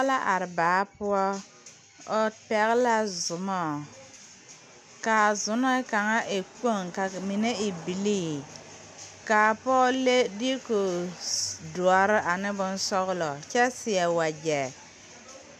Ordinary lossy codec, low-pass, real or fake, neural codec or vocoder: AAC, 96 kbps; 14.4 kHz; real; none